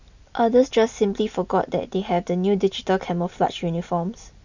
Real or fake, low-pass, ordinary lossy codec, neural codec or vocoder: real; 7.2 kHz; none; none